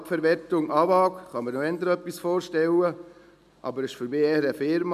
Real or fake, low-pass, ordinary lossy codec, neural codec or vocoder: real; 14.4 kHz; none; none